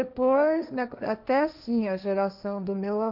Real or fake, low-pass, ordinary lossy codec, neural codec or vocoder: fake; 5.4 kHz; none; codec, 16 kHz, 1.1 kbps, Voila-Tokenizer